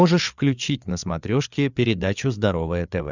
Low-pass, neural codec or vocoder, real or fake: 7.2 kHz; codec, 16 kHz, 8 kbps, FreqCodec, larger model; fake